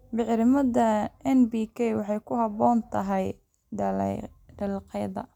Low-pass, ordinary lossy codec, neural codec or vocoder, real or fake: 19.8 kHz; none; none; real